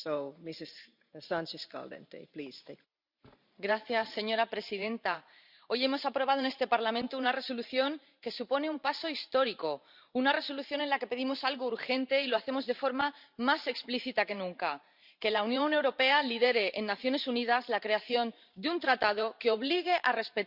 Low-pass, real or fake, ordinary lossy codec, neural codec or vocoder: 5.4 kHz; fake; Opus, 64 kbps; vocoder, 44.1 kHz, 128 mel bands every 512 samples, BigVGAN v2